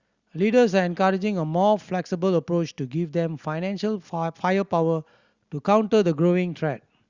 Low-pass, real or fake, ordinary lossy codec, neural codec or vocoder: 7.2 kHz; real; Opus, 64 kbps; none